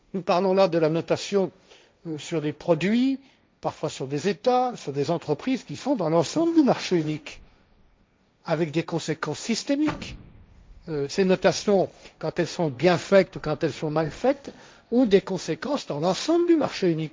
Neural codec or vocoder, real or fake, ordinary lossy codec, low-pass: codec, 16 kHz, 1.1 kbps, Voila-Tokenizer; fake; none; none